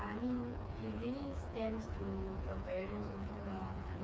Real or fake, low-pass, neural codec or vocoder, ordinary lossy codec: fake; none; codec, 16 kHz, 4 kbps, FreqCodec, smaller model; none